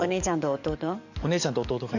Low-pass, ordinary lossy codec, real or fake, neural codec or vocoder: 7.2 kHz; none; fake; codec, 44.1 kHz, 7.8 kbps, DAC